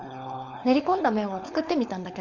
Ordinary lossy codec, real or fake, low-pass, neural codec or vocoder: none; fake; 7.2 kHz; codec, 16 kHz, 4.8 kbps, FACodec